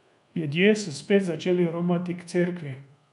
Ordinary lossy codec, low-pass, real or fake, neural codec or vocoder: none; 10.8 kHz; fake; codec, 24 kHz, 1.2 kbps, DualCodec